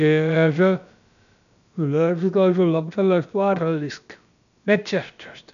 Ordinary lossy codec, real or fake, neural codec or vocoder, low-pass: none; fake; codec, 16 kHz, about 1 kbps, DyCAST, with the encoder's durations; 7.2 kHz